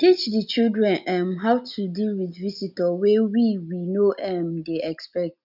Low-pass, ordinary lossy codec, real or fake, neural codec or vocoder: 5.4 kHz; none; real; none